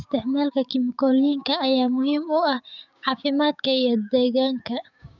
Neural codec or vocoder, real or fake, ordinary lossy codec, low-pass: codec, 16 kHz, 6 kbps, DAC; fake; none; 7.2 kHz